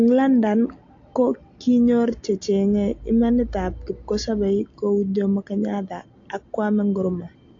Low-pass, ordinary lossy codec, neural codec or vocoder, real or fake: 7.2 kHz; AAC, 48 kbps; none; real